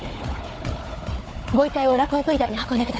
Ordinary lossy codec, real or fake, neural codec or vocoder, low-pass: none; fake; codec, 16 kHz, 4 kbps, FunCodec, trained on Chinese and English, 50 frames a second; none